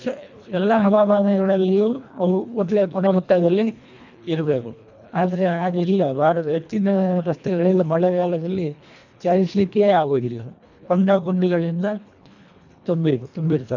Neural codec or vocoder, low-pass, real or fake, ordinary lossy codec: codec, 24 kHz, 1.5 kbps, HILCodec; 7.2 kHz; fake; none